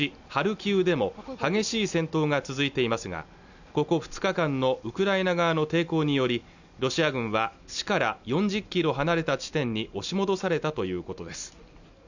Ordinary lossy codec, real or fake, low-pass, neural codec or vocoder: none; real; 7.2 kHz; none